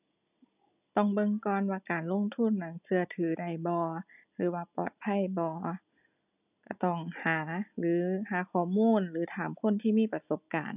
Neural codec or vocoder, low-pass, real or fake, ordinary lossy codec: none; 3.6 kHz; real; none